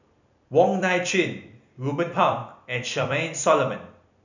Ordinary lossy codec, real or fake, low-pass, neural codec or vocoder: none; fake; 7.2 kHz; vocoder, 44.1 kHz, 128 mel bands every 256 samples, BigVGAN v2